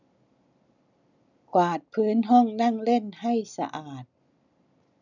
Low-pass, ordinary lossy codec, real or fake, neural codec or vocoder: 7.2 kHz; none; real; none